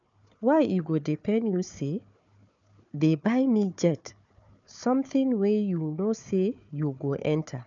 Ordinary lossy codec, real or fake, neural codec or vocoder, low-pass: none; fake; codec, 16 kHz, 4 kbps, FunCodec, trained on Chinese and English, 50 frames a second; 7.2 kHz